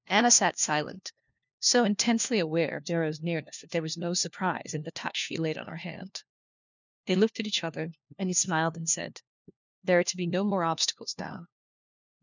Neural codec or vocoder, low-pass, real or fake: codec, 16 kHz, 1 kbps, FunCodec, trained on LibriTTS, 50 frames a second; 7.2 kHz; fake